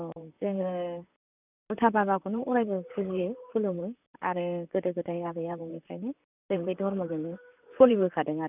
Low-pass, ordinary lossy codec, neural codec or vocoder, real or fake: 3.6 kHz; none; vocoder, 44.1 kHz, 128 mel bands, Pupu-Vocoder; fake